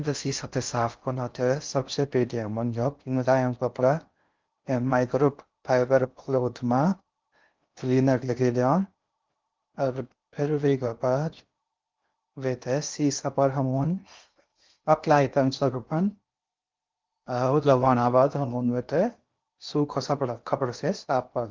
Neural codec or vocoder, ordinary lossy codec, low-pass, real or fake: codec, 16 kHz in and 24 kHz out, 0.6 kbps, FocalCodec, streaming, 4096 codes; Opus, 24 kbps; 7.2 kHz; fake